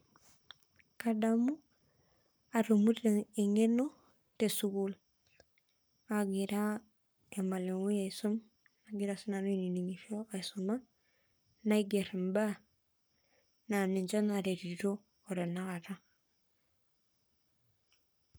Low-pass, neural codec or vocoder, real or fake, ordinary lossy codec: none; codec, 44.1 kHz, 7.8 kbps, Pupu-Codec; fake; none